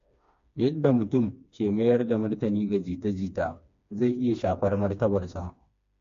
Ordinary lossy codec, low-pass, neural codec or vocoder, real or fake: MP3, 48 kbps; 7.2 kHz; codec, 16 kHz, 2 kbps, FreqCodec, smaller model; fake